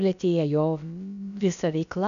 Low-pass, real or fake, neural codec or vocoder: 7.2 kHz; fake; codec, 16 kHz, about 1 kbps, DyCAST, with the encoder's durations